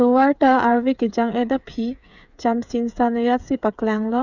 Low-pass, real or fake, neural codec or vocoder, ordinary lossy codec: 7.2 kHz; fake; codec, 16 kHz, 8 kbps, FreqCodec, smaller model; none